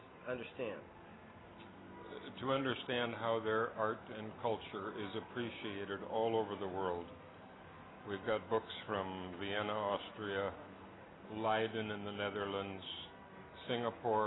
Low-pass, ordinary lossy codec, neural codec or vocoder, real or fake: 7.2 kHz; AAC, 16 kbps; none; real